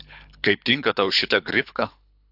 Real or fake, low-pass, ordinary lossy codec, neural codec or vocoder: fake; 5.4 kHz; AAC, 48 kbps; codec, 24 kHz, 6 kbps, HILCodec